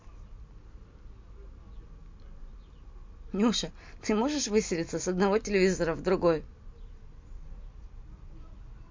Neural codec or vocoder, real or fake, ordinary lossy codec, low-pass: none; real; MP3, 48 kbps; 7.2 kHz